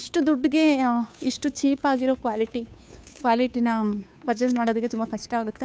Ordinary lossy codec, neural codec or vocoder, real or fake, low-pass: none; codec, 16 kHz, 2 kbps, FunCodec, trained on Chinese and English, 25 frames a second; fake; none